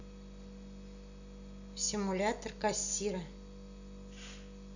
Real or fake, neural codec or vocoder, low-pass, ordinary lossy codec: real; none; 7.2 kHz; none